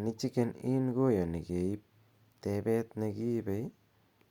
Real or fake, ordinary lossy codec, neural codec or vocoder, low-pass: real; Opus, 64 kbps; none; 19.8 kHz